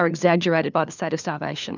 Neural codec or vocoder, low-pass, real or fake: codec, 16 kHz, 4 kbps, FunCodec, trained on LibriTTS, 50 frames a second; 7.2 kHz; fake